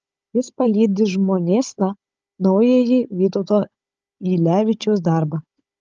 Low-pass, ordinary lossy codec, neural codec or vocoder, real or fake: 7.2 kHz; Opus, 24 kbps; codec, 16 kHz, 16 kbps, FunCodec, trained on Chinese and English, 50 frames a second; fake